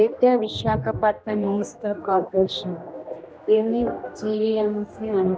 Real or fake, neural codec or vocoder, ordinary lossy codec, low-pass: fake; codec, 16 kHz, 1 kbps, X-Codec, HuBERT features, trained on general audio; none; none